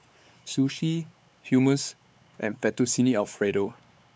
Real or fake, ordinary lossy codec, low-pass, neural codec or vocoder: fake; none; none; codec, 16 kHz, 4 kbps, X-Codec, WavLM features, trained on Multilingual LibriSpeech